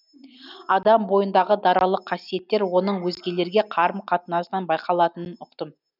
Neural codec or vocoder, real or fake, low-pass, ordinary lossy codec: none; real; 5.4 kHz; none